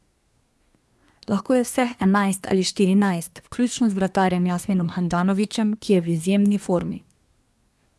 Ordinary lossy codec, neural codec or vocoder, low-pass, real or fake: none; codec, 24 kHz, 1 kbps, SNAC; none; fake